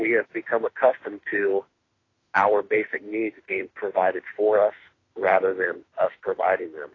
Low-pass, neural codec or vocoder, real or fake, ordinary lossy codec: 7.2 kHz; autoencoder, 48 kHz, 32 numbers a frame, DAC-VAE, trained on Japanese speech; fake; AAC, 32 kbps